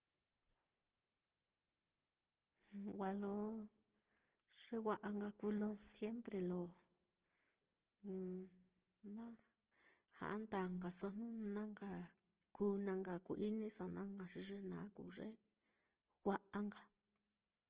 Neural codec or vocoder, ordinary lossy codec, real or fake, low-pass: none; Opus, 16 kbps; real; 3.6 kHz